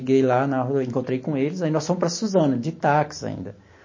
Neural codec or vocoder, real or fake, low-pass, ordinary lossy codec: none; real; 7.2 kHz; MP3, 32 kbps